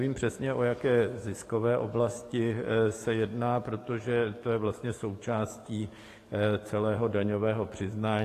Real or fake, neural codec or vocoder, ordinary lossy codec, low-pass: fake; codec, 44.1 kHz, 7.8 kbps, DAC; AAC, 48 kbps; 14.4 kHz